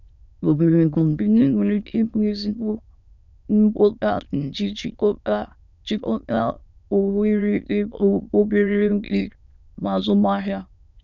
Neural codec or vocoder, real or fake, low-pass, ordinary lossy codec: autoencoder, 22.05 kHz, a latent of 192 numbers a frame, VITS, trained on many speakers; fake; 7.2 kHz; none